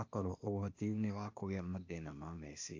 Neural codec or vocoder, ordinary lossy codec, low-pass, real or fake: codec, 16 kHz, 0.8 kbps, ZipCodec; AAC, 48 kbps; 7.2 kHz; fake